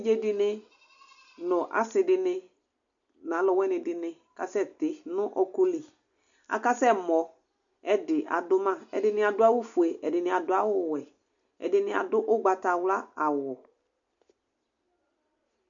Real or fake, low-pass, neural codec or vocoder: real; 7.2 kHz; none